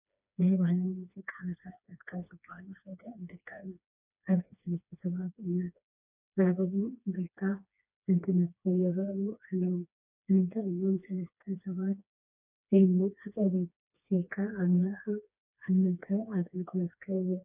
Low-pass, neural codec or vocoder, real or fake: 3.6 kHz; codec, 16 kHz, 2 kbps, FreqCodec, smaller model; fake